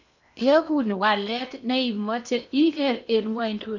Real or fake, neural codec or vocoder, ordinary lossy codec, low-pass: fake; codec, 16 kHz in and 24 kHz out, 0.8 kbps, FocalCodec, streaming, 65536 codes; none; 7.2 kHz